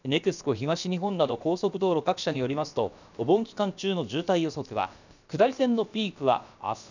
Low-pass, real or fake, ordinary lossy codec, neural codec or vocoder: 7.2 kHz; fake; none; codec, 16 kHz, about 1 kbps, DyCAST, with the encoder's durations